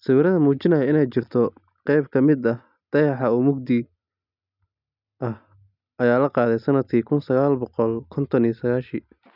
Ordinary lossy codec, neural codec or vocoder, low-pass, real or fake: none; none; 5.4 kHz; real